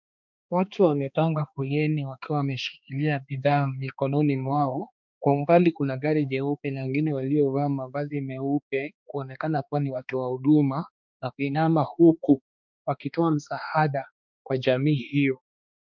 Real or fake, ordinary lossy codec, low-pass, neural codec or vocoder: fake; MP3, 64 kbps; 7.2 kHz; codec, 16 kHz, 2 kbps, X-Codec, HuBERT features, trained on balanced general audio